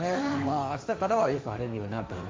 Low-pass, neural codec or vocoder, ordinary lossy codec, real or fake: none; codec, 16 kHz, 1.1 kbps, Voila-Tokenizer; none; fake